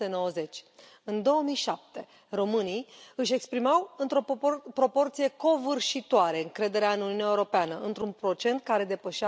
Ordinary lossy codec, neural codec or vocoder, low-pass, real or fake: none; none; none; real